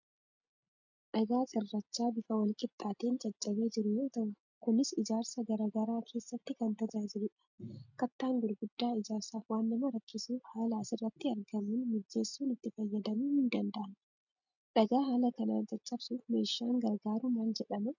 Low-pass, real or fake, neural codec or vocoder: 7.2 kHz; real; none